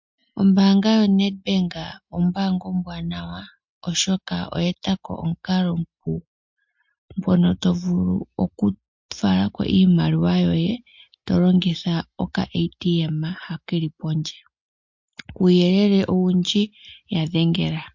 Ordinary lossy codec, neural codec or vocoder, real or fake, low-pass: MP3, 48 kbps; none; real; 7.2 kHz